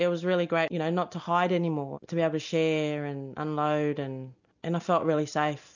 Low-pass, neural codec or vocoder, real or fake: 7.2 kHz; none; real